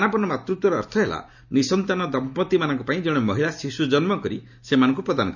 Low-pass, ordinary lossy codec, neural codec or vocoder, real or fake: 7.2 kHz; none; none; real